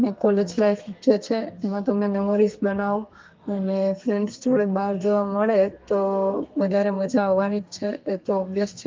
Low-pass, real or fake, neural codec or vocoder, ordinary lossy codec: 7.2 kHz; fake; codec, 32 kHz, 1.9 kbps, SNAC; Opus, 32 kbps